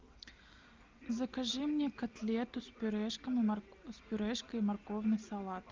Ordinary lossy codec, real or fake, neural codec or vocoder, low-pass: Opus, 32 kbps; real; none; 7.2 kHz